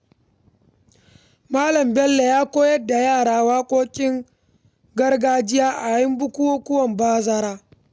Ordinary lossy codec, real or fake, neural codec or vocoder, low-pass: none; real; none; none